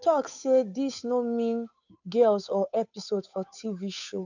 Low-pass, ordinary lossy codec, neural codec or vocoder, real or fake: 7.2 kHz; none; codec, 16 kHz, 8 kbps, FunCodec, trained on Chinese and English, 25 frames a second; fake